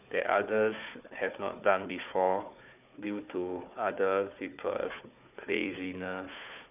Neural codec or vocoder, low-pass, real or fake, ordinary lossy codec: codec, 16 kHz, 4 kbps, FunCodec, trained on Chinese and English, 50 frames a second; 3.6 kHz; fake; none